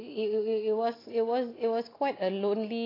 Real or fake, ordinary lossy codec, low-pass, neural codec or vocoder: real; AAC, 24 kbps; 5.4 kHz; none